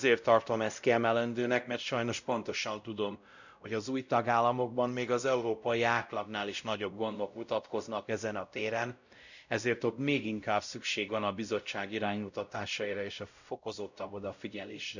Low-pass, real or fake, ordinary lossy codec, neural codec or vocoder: 7.2 kHz; fake; none; codec, 16 kHz, 0.5 kbps, X-Codec, WavLM features, trained on Multilingual LibriSpeech